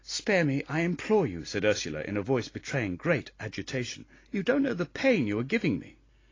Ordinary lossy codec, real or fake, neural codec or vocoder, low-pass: AAC, 32 kbps; real; none; 7.2 kHz